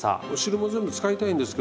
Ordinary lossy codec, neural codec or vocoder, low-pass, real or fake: none; none; none; real